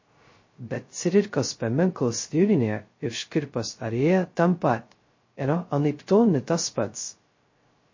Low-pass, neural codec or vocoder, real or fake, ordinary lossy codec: 7.2 kHz; codec, 16 kHz, 0.2 kbps, FocalCodec; fake; MP3, 32 kbps